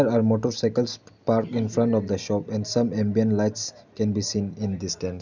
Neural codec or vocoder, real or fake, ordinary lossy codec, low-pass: none; real; none; 7.2 kHz